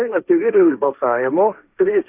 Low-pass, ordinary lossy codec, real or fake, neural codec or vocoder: 3.6 kHz; Opus, 64 kbps; fake; codec, 16 kHz, 1.1 kbps, Voila-Tokenizer